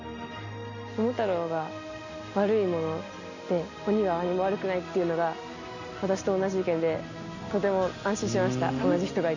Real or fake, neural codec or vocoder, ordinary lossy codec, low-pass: real; none; none; 7.2 kHz